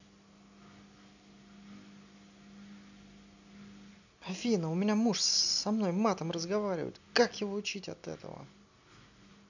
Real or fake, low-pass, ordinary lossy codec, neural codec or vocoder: real; 7.2 kHz; none; none